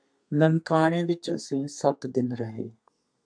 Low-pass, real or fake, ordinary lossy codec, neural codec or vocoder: 9.9 kHz; fake; AAC, 64 kbps; codec, 32 kHz, 1.9 kbps, SNAC